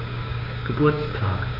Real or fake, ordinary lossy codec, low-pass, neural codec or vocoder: fake; AAC, 48 kbps; 5.4 kHz; codec, 16 kHz, 6 kbps, DAC